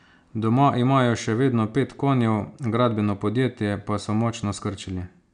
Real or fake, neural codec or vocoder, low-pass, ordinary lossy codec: real; none; 9.9 kHz; MP3, 96 kbps